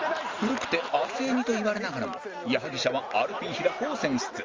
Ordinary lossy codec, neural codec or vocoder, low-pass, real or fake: Opus, 32 kbps; none; 7.2 kHz; real